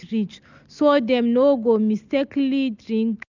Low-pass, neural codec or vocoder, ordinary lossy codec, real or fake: 7.2 kHz; none; none; real